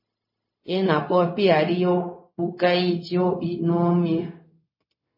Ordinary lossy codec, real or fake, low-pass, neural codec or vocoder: MP3, 24 kbps; fake; 5.4 kHz; codec, 16 kHz, 0.4 kbps, LongCat-Audio-Codec